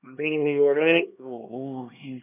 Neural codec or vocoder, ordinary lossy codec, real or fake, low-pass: codec, 16 kHz, 2 kbps, X-Codec, HuBERT features, trained on LibriSpeech; none; fake; 3.6 kHz